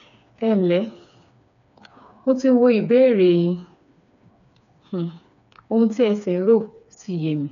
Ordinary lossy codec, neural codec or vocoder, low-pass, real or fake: none; codec, 16 kHz, 4 kbps, FreqCodec, smaller model; 7.2 kHz; fake